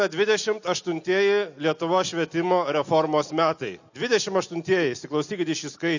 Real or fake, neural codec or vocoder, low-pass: real; none; 7.2 kHz